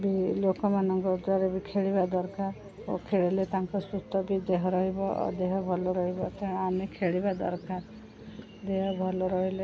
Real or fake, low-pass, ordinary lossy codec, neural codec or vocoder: real; none; none; none